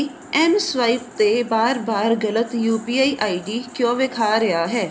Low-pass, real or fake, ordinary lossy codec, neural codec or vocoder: none; real; none; none